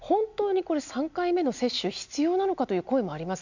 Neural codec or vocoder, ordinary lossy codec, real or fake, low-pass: none; none; real; 7.2 kHz